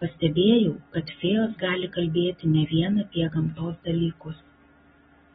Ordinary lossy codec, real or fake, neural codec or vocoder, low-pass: AAC, 16 kbps; real; none; 19.8 kHz